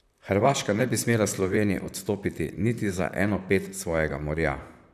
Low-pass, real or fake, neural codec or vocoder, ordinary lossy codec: 14.4 kHz; fake; vocoder, 44.1 kHz, 128 mel bands, Pupu-Vocoder; none